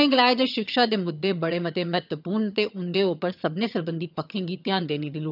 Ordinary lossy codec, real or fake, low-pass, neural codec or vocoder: none; fake; 5.4 kHz; vocoder, 22.05 kHz, 80 mel bands, HiFi-GAN